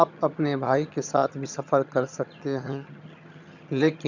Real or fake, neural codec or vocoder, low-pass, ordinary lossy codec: fake; vocoder, 22.05 kHz, 80 mel bands, HiFi-GAN; 7.2 kHz; none